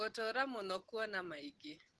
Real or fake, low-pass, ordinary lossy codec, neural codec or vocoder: real; 10.8 kHz; Opus, 16 kbps; none